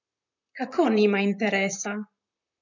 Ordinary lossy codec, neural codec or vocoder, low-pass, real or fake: none; vocoder, 44.1 kHz, 128 mel bands, Pupu-Vocoder; 7.2 kHz; fake